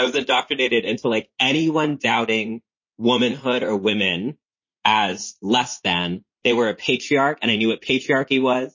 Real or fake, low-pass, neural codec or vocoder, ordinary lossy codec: fake; 7.2 kHz; vocoder, 44.1 kHz, 80 mel bands, Vocos; MP3, 32 kbps